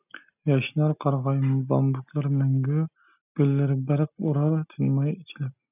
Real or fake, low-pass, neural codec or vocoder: real; 3.6 kHz; none